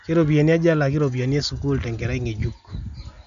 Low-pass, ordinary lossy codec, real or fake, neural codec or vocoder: 7.2 kHz; none; real; none